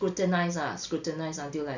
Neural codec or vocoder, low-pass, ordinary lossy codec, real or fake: none; 7.2 kHz; none; real